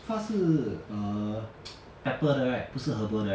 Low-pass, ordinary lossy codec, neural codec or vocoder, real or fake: none; none; none; real